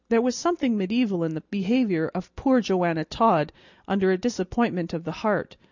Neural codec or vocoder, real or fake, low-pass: none; real; 7.2 kHz